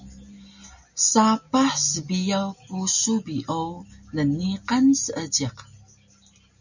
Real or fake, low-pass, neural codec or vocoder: real; 7.2 kHz; none